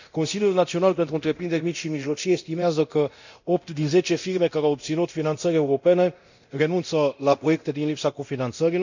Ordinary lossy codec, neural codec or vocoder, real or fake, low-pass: none; codec, 24 kHz, 0.9 kbps, DualCodec; fake; 7.2 kHz